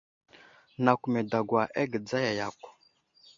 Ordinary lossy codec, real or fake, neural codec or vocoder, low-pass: AAC, 64 kbps; real; none; 7.2 kHz